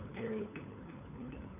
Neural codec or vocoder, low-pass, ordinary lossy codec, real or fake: codec, 16 kHz, 4 kbps, FunCodec, trained on LibriTTS, 50 frames a second; 3.6 kHz; none; fake